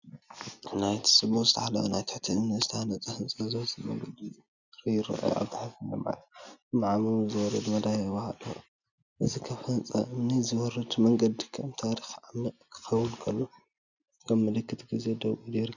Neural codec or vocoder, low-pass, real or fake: none; 7.2 kHz; real